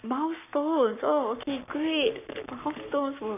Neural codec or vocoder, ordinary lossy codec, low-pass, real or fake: codec, 44.1 kHz, 7.8 kbps, Pupu-Codec; none; 3.6 kHz; fake